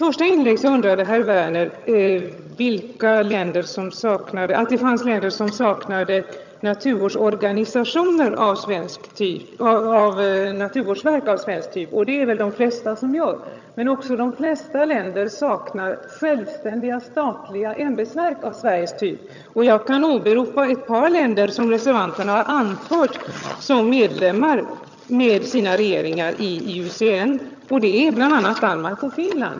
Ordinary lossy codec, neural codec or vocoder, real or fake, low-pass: none; vocoder, 22.05 kHz, 80 mel bands, HiFi-GAN; fake; 7.2 kHz